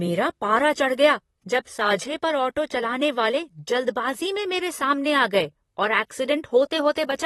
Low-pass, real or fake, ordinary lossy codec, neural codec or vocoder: 19.8 kHz; fake; AAC, 32 kbps; vocoder, 44.1 kHz, 128 mel bands, Pupu-Vocoder